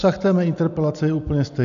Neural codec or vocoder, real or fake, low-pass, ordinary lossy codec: none; real; 7.2 kHz; AAC, 96 kbps